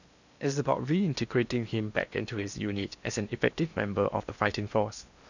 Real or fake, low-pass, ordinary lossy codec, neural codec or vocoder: fake; 7.2 kHz; none; codec, 16 kHz in and 24 kHz out, 0.8 kbps, FocalCodec, streaming, 65536 codes